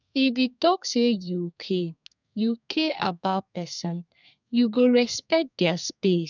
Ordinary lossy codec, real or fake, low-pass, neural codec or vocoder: none; fake; 7.2 kHz; codec, 32 kHz, 1.9 kbps, SNAC